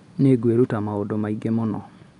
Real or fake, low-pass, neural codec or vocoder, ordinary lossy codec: real; 10.8 kHz; none; none